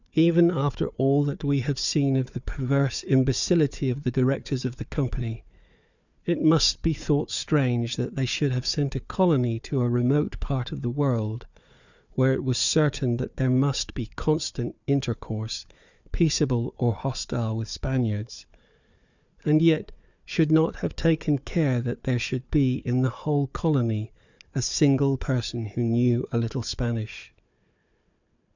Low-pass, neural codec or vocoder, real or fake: 7.2 kHz; codec, 16 kHz, 4 kbps, FunCodec, trained on Chinese and English, 50 frames a second; fake